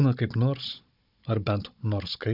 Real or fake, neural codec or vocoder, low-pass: fake; codec, 16 kHz, 16 kbps, FunCodec, trained on Chinese and English, 50 frames a second; 5.4 kHz